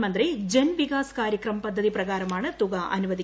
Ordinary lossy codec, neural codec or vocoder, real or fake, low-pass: none; none; real; none